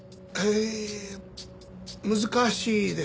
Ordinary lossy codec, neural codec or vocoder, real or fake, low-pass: none; none; real; none